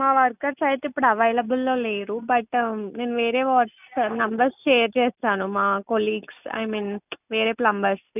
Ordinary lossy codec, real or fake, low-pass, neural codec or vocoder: none; real; 3.6 kHz; none